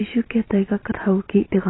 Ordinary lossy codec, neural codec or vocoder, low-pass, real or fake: AAC, 16 kbps; vocoder, 44.1 kHz, 128 mel bands every 512 samples, BigVGAN v2; 7.2 kHz; fake